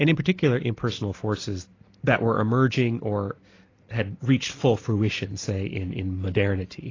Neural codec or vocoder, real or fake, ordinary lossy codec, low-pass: none; real; AAC, 32 kbps; 7.2 kHz